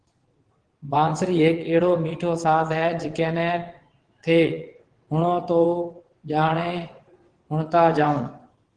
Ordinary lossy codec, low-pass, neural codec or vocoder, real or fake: Opus, 16 kbps; 9.9 kHz; vocoder, 22.05 kHz, 80 mel bands, WaveNeXt; fake